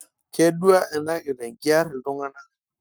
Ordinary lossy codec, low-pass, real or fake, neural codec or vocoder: none; none; fake; codec, 44.1 kHz, 7.8 kbps, Pupu-Codec